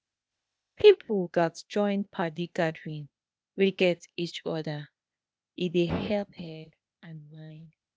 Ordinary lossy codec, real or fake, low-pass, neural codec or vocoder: none; fake; none; codec, 16 kHz, 0.8 kbps, ZipCodec